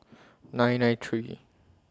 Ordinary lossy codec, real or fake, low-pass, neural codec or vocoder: none; real; none; none